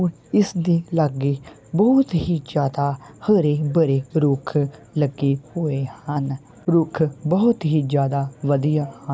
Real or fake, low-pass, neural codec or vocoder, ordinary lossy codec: real; none; none; none